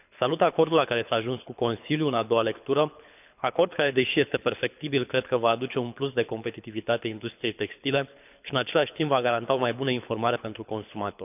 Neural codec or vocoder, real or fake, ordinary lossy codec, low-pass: codec, 24 kHz, 6 kbps, HILCodec; fake; none; 3.6 kHz